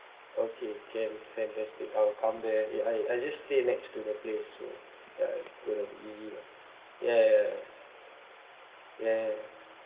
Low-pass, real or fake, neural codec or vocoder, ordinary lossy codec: 3.6 kHz; real; none; Opus, 16 kbps